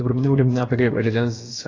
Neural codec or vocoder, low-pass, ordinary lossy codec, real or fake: codec, 16 kHz, about 1 kbps, DyCAST, with the encoder's durations; 7.2 kHz; AAC, 32 kbps; fake